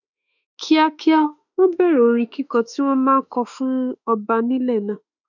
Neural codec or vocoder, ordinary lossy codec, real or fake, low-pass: autoencoder, 48 kHz, 32 numbers a frame, DAC-VAE, trained on Japanese speech; none; fake; 7.2 kHz